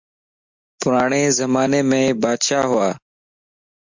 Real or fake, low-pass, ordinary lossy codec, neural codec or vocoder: real; 7.2 kHz; MP3, 64 kbps; none